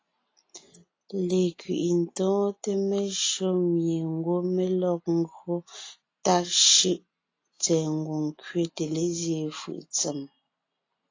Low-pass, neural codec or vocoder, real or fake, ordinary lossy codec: 7.2 kHz; none; real; AAC, 32 kbps